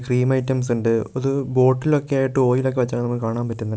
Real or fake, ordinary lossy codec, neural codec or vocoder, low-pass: real; none; none; none